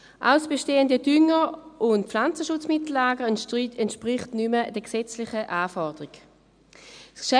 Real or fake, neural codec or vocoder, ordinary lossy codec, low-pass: real; none; none; 9.9 kHz